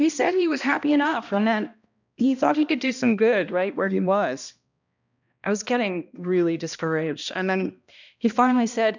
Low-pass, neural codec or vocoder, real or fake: 7.2 kHz; codec, 16 kHz, 1 kbps, X-Codec, HuBERT features, trained on balanced general audio; fake